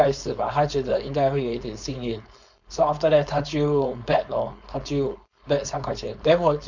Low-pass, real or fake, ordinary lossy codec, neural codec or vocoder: 7.2 kHz; fake; MP3, 64 kbps; codec, 16 kHz, 4.8 kbps, FACodec